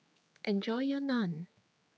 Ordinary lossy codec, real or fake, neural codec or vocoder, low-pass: none; fake; codec, 16 kHz, 4 kbps, X-Codec, HuBERT features, trained on general audio; none